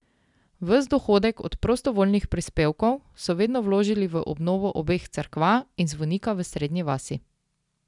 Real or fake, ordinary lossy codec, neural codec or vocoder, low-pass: real; none; none; 10.8 kHz